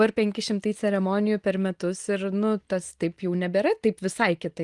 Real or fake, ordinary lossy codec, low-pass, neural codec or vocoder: real; Opus, 24 kbps; 10.8 kHz; none